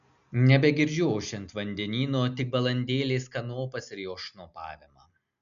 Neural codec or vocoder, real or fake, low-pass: none; real; 7.2 kHz